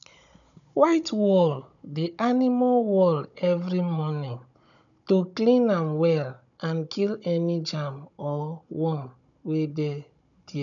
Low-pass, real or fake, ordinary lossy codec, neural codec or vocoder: 7.2 kHz; fake; none; codec, 16 kHz, 16 kbps, FunCodec, trained on Chinese and English, 50 frames a second